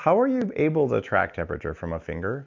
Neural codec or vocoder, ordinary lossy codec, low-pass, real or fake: none; MP3, 64 kbps; 7.2 kHz; real